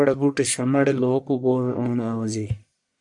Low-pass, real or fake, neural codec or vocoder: 10.8 kHz; fake; codec, 44.1 kHz, 1.7 kbps, Pupu-Codec